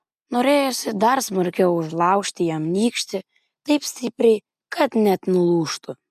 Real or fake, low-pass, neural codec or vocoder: real; 14.4 kHz; none